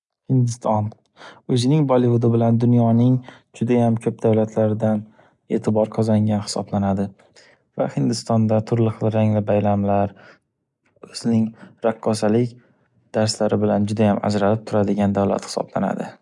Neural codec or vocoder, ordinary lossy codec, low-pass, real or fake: none; none; 10.8 kHz; real